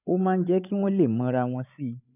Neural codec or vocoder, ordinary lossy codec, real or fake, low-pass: codec, 16 kHz, 8 kbps, FreqCodec, larger model; none; fake; 3.6 kHz